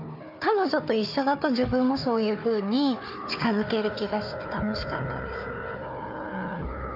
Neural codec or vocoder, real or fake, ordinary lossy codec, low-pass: codec, 16 kHz, 4 kbps, FunCodec, trained on Chinese and English, 50 frames a second; fake; none; 5.4 kHz